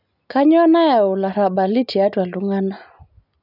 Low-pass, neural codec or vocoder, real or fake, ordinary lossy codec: 5.4 kHz; none; real; none